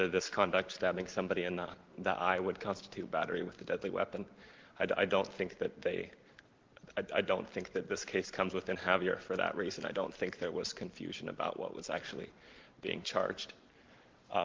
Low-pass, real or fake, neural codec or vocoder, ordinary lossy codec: 7.2 kHz; real; none; Opus, 16 kbps